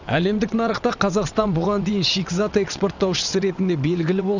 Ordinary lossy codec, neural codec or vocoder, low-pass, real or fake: none; vocoder, 22.05 kHz, 80 mel bands, WaveNeXt; 7.2 kHz; fake